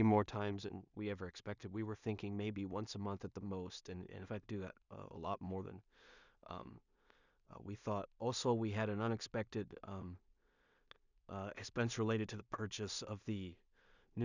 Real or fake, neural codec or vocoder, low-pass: fake; codec, 16 kHz in and 24 kHz out, 0.4 kbps, LongCat-Audio-Codec, two codebook decoder; 7.2 kHz